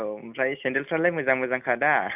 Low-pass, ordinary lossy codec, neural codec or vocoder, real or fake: 3.6 kHz; none; none; real